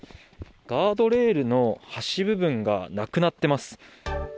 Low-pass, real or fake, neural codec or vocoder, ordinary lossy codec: none; real; none; none